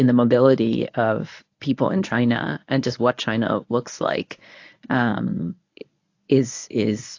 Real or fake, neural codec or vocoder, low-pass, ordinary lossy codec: fake; codec, 24 kHz, 0.9 kbps, WavTokenizer, medium speech release version 1; 7.2 kHz; AAC, 48 kbps